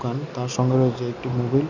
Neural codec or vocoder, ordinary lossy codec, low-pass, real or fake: none; none; 7.2 kHz; real